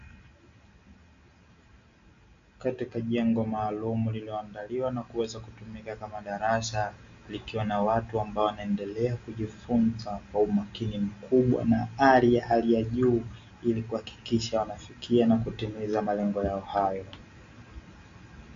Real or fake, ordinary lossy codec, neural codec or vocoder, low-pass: real; AAC, 64 kbps; none; 7.2 kHz